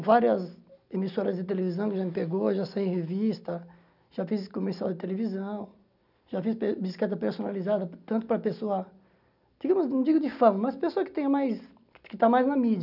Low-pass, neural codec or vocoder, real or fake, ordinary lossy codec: 5.4 kHz; none; real; none